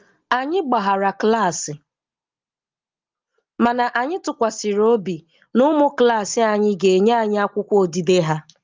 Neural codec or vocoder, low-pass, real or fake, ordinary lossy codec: none; 7.2 kHz; real; Opus, 32 kbps